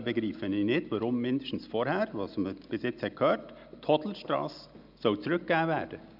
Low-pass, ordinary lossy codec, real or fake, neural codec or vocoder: 5.4 kHz; none; real; none